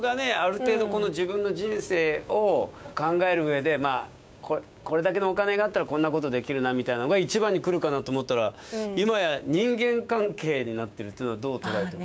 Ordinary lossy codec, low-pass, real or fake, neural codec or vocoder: none; none; fake; codec, 16 kHz, 6 kbps, DAC